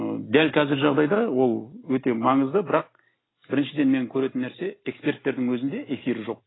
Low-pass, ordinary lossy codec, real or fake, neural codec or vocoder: 7.2 kHz; AAC, 16 kbps; real; none